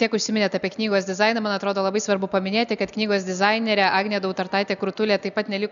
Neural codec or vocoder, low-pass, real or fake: none; 7.2 kHz; real